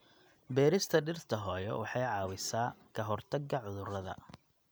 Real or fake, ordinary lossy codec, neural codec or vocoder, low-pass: real; none; none; none